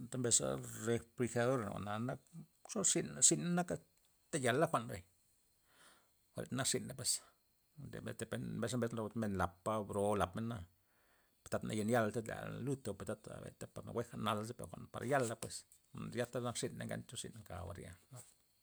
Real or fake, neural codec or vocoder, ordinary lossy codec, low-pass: real; none; none; none